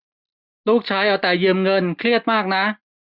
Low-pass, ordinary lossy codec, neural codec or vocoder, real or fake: 5.4 kHz; none; none; real